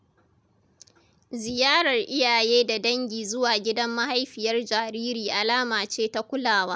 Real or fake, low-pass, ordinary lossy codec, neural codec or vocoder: real; none; none; none